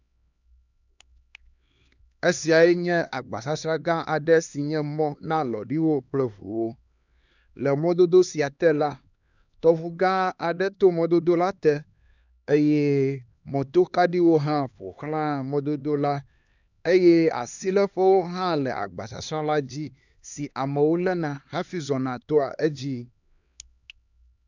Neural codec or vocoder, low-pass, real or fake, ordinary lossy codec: codec, 16 kHz, 2 kbps, X-Codec, HuBERT features, trained on LibriSpeech; 7.2 kHz; fake; none